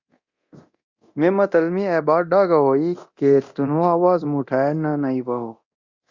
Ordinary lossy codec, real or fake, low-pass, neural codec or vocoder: Opus, 64 kbps; fake; 7.2 kHz; codec, 24 kHz, 0.9 kbps, DualCodec